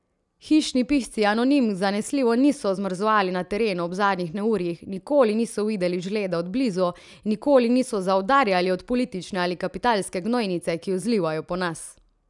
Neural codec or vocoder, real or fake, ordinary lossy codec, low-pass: none; real; none; 10.8 kHz